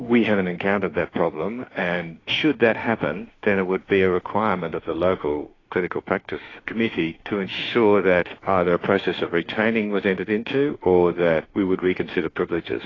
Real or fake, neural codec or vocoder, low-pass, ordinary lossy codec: fake; autoencoder, 48 kHz, 32 numbers a frame, DAC-VAE, trained on Japanese speech; 7.2 kHz; AAC, 32 kbps